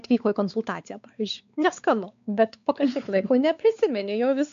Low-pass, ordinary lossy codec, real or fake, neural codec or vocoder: 7.2 kHz; MP3, 64 kbps; fake; codec, 16 kHz, 4 kbps, X-Codec, WavLM features, trained on Multilingual LibriSpeech